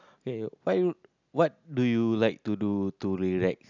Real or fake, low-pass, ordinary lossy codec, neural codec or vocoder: real; 7.2 kHz; none; none